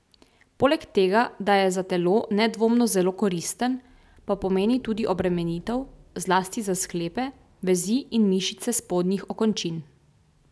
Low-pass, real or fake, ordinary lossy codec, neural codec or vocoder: none; real; none; none